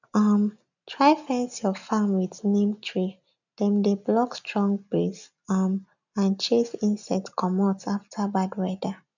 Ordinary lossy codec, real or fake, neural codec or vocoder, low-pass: none; real; none; 7.2 kHz